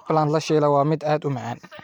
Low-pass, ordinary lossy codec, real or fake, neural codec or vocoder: 19.8 kHz; none; fake; vocoder, 44.1 kHz, 128 mel bands every 512 samples, BigVGAN v2